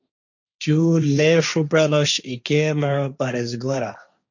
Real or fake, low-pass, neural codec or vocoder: fake; 7.2 kHz; codec, 16 kHz, 1.1 kbps, Voila-Tokenizer